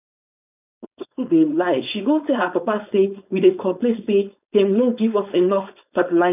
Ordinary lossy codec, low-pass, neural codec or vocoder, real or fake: none; 3.6 kHz; codec, 16 kHz, 4.8 kbps, FACodec; fake